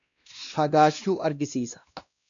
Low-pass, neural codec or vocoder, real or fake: 7.2 kHz; codec, 16 kHz, 1 kbps, X-Codec, WavLM features, trained on Multilingual LibriSpeech; fake